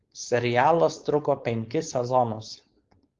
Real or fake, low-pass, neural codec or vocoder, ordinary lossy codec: fake; 7.2 kHz; codec, 16 kHz, 4.8 kbps, FACodec; Opus, 32 kbps